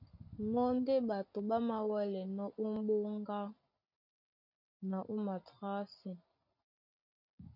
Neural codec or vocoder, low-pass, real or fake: none; 5.4 kHz; real